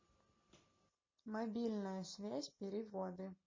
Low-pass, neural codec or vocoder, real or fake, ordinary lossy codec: 7.2 kHz; none; real; MP3, 32 kbps